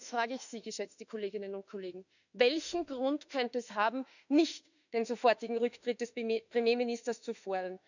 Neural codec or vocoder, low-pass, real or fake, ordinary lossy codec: autoencoder, 48 kHz, 32 numbers a frame, DAC-VAE, trained on Japanese speech; 7.2 kHz; fake; none